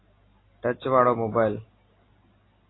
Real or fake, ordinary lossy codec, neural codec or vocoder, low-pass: real; AAC, 16 kbps; none; 7.2 kHz